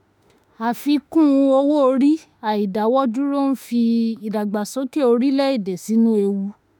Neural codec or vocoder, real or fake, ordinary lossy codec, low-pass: autoencoder, 48 kHz, 32 numbers a frame, DAC-VAE, trained on Japanese speech; fake; none; none